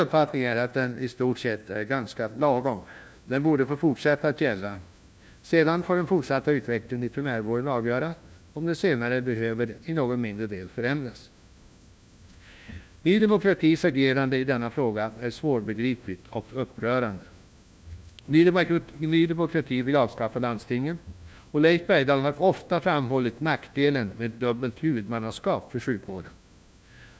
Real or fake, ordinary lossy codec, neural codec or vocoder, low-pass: fake; none; codec, 16 kHz, 1 kbps, FunCodec, trained on LibriTTS, 50 frames a second; none